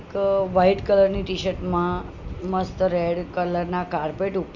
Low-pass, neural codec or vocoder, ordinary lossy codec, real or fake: 7.2 kHz; none; none; real